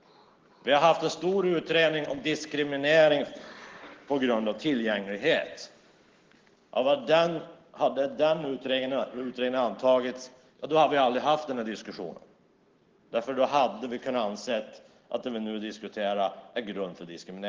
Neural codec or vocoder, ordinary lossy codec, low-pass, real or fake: none; Opus, 16 kbps; 7.2 kHz; real